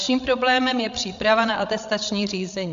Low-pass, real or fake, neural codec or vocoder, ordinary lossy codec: 7.2 kHz; fake; codec, 16 kHz, 16 kbps, FreqCodec, larger model; AAC, 64 kbps